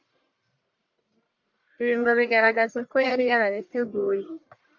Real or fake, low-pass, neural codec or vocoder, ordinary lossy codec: fake; 7.2 kHz; codec, 44.1 kHz, 1.7 kbps, Pupu-Codec; MP3, 48 kbps